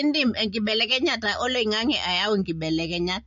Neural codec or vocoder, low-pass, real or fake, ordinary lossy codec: none; 7.2 kHz; real; MP3, 48 kbps